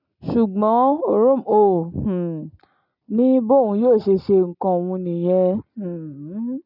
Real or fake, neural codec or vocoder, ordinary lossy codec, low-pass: real; none; none; 5.4 kHz